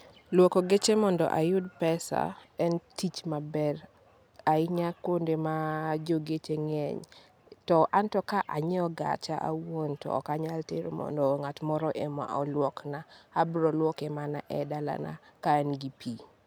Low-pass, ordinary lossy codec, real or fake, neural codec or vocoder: none; none; real; none